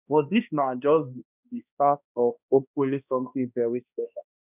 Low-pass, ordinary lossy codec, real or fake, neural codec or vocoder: 3.6 kHz; none; fake; codec, 16 kHz, 1 kbps, X-Codec, HuBERT features, trained on balanced general audio